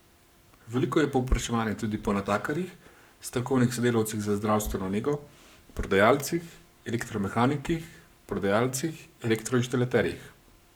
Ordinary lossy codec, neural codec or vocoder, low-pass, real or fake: none; codec, 44.1 kHz, 7.8 kbps, Pupu-Codec; none; fake